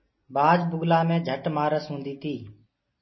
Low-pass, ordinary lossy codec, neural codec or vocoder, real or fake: 7.2 kHz; MP3, 24 kbps; none; real